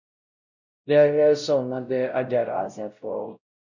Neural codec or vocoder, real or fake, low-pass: codec, 16 kHz, 0.5 kbps, X-Codec, WavLM features, trained on Multilingual LibriSpeech; fake; 7.2 kHz